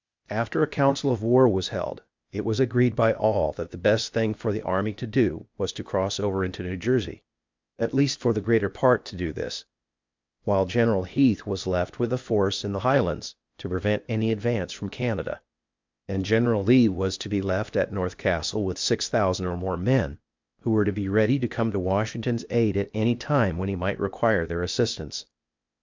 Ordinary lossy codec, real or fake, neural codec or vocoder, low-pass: MP3, 64 kbps; fake; codec, 16 kHz, 0.8 kbps, ZipCodec; 7.2 kHz